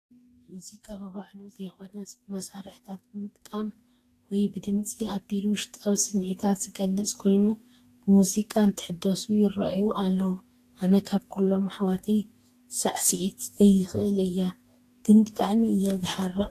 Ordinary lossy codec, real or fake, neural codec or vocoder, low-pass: AAC, 64 kbps; fake; codec, 44.1 kHz, 2.6 kbps, DAC; 14.4 kHz